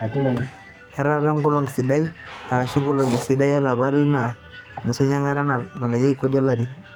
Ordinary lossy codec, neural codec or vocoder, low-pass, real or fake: none; codec, 44.1 kHz, 2.6 kbps, SNAC; none; fake